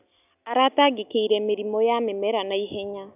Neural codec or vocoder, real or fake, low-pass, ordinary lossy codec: none; real; 3.6 kHz; none